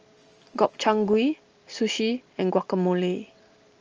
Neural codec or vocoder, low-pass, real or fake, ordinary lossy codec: none; 7.2 kHz; real; Opus, 24 kbps